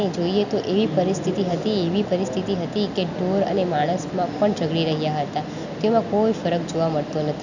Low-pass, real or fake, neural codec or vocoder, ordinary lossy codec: 7.2 kHz; real; none; none